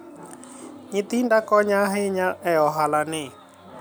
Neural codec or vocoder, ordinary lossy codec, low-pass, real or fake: none; none; none; real